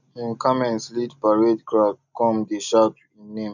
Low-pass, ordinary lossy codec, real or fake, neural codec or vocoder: 7.2 kHz; none; real; none